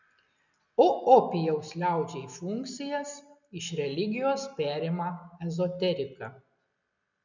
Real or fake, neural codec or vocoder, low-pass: real; none; 7.2 kHz